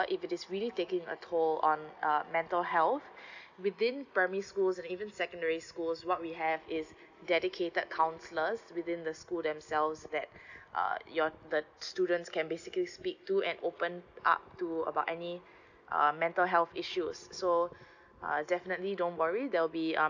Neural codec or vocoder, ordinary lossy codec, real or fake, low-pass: none; none; real; 7.2 kHz